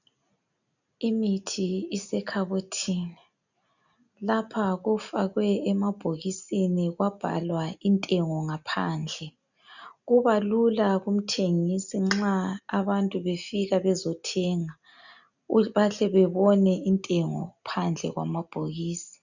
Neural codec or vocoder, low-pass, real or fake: none; 7.2 kHz; real